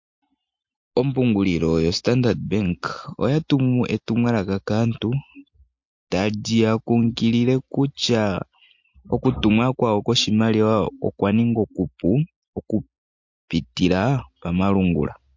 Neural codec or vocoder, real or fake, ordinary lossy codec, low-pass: none; real; MP3, 48 kbps; 7.2 kHz